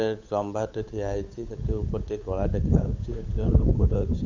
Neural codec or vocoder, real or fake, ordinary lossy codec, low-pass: codec, 16 kHz, 8 kbps, FunCodec, trained on Chinese and English, 25 frames a second; fake; AAC, 48 kbps; 7.2 kHz